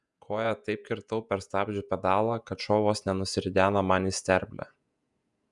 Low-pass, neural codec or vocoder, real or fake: 10.8 kHz; vocoder, 48 kHz, 128 mel bands, Vocos; fake